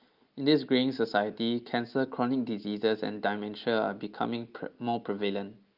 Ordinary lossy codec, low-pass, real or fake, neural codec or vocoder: Opus, 24 kbps; 5.4 kHz; real; none